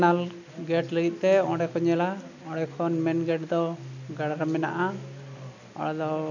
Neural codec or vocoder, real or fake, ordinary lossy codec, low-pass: none; real; none; 7.2 kHz